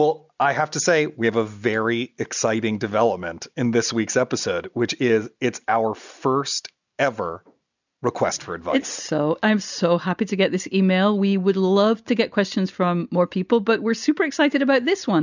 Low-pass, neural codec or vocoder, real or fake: 7.2 kHz; none; real